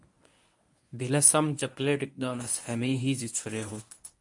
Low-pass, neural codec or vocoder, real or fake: 10.8 kHz; codec, 24 kHz, 0.9 kbps, WavTokenizer, medium speech release version 1; fake